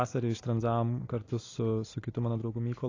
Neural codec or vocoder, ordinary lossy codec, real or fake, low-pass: none; AAC, 32 kbps; real; 7.2 kHz